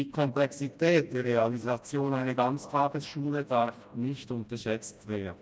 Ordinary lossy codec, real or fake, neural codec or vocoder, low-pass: none; fake; codec, 16 kHz, 1 kbps, FreqCodec, smaller model; none